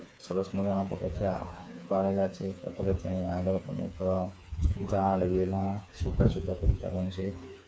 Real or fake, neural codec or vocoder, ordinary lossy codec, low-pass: fake; codec, 16 kHz, 4 kbps, FreqCodec, smaller model; none; none